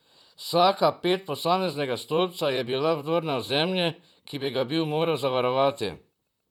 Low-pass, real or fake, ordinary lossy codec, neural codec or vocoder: 19.8 kHz; fake; none; vocoder, 44.1 kHz, 128 mel bands, Pupu-Vocoder